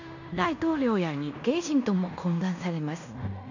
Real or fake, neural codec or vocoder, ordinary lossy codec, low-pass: fake; codec, 16 kHz in and 24 kHz out, 0.9 kbps, LongCat-Audio-Codec, fine tuned four codebook decoder; none; 7.2 kHz